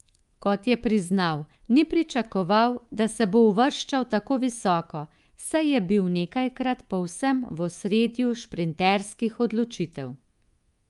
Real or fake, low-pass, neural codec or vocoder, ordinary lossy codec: fake; 10.8 kHz; codec, 24 kHz, 3.1 kbps, DualCodec; Opus, 32 kbps